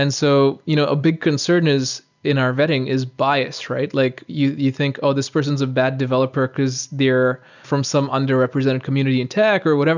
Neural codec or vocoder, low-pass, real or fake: none; 7.2 kHz; real